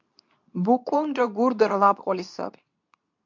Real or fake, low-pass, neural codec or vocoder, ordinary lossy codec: fake; 7.2 kHz; codec, 24 kHz, 0.9 kbps, WavTokenizer, medium speech release version 2; MP3, 64 kbps